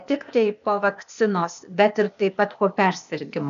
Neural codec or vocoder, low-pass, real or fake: codec, 16 kHz, 0.8 kbps, ZipCodec; 7.2 kHz; fake